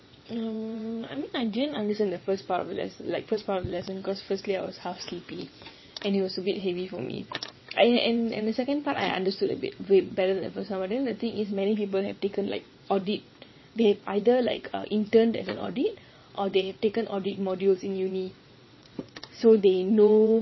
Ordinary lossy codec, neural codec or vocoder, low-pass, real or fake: MP3, 24 kbps; vocoder, 22.05 kHz, 80 mel bands, WaveNeXt; 7.2 kHz; fake